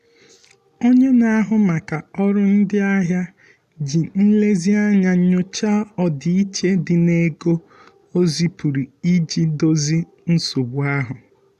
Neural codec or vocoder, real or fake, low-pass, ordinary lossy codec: none; real; 14.4 kHz; none